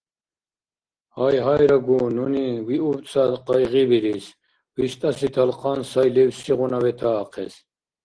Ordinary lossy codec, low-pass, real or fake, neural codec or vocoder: Opus, 16 kbps; 9.9 kHz; real; none